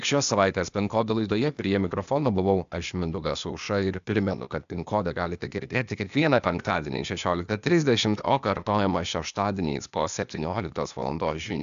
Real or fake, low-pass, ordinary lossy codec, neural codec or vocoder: fake; 7.2 kHz; AAC, 96 kbps; codec, 16 kHz, 0.8 kbps, ZipCodec